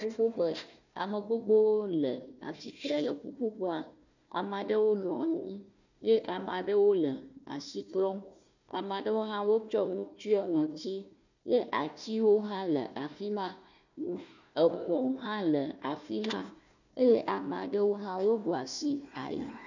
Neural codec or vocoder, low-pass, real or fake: codec, 16 kHz, 1 kbps, FunCodec, trained on Chinese and English, 50 frames a second; 7.2 kHz; fake